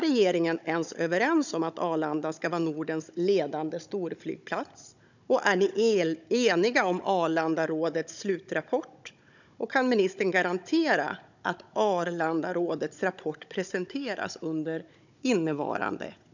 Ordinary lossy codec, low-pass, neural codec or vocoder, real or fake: none; 7.2 kHz; codec, 16 kHz, 16 kbps, FunCodec, trained on Chinese and English, 50 frames a second; fake